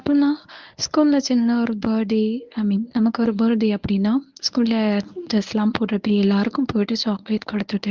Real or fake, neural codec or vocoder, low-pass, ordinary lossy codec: fake; codec, 24 kHz, 0.9 kbps, WavTokenizer, medium speech release version 1; 7.2 kHz; Opus, 32 kbps